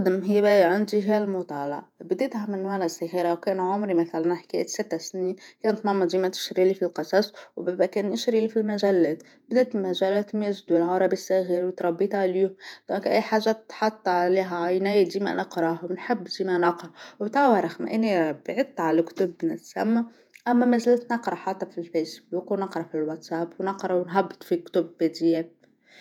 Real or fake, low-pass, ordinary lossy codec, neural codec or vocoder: fake; 19.8 kHz; none; vocoder, 48 kHz, 128 mel bands, Vocos